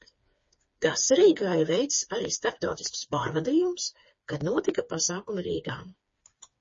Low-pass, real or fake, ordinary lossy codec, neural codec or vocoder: 7.2 kHz; fake; MP3, 32 kbps; codec, 16 kHz, 4 kbps, FreqCodec, smaller model